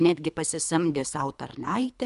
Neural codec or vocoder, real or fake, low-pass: codec, 24 kHz, 3 kbps, HILCodec; fake; 10.8 kHz